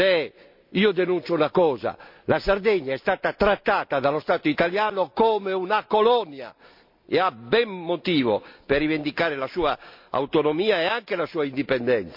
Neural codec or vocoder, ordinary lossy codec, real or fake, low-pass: none; MP3, 48 kbps; real; 5.4 kHz